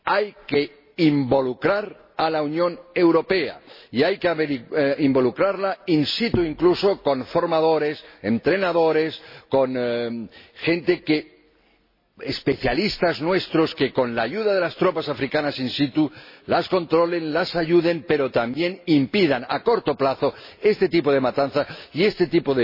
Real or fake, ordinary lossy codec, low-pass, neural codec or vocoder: real; MP3, 24 kbps; 5.4 kHz; none